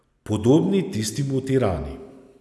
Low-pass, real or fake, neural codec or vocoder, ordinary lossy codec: none; real; none; none